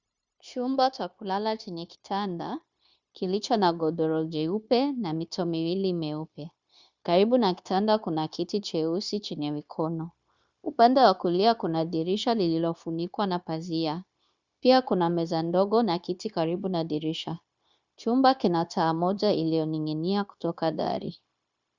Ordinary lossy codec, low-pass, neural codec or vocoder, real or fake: Opus, 64 kbps; 7.2 kHz; codec, 16 kHz, 0.9 kbps, LongCat-Audio-Codec; fake